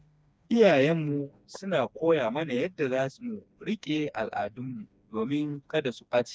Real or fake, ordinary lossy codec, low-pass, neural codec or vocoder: fake; none; none; codec, 16 kHz, 2 kbps, FreqCodec, smaller model